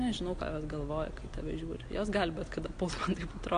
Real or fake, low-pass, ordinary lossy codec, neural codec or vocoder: real; 9.9 kHz; AAC, 48 kbps; none